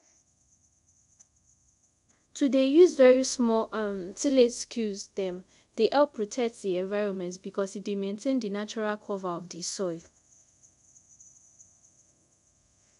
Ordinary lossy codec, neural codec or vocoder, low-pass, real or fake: none; codec, 24 kHz, 0.5 kbps, DualCodec; 10.8 kHz; fake